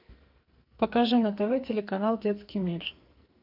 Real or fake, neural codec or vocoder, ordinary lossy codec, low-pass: fake; codec, 44.1 kHz, 2.6 kbps, SNAC; AAC, 48 kbps; 5.4 kHz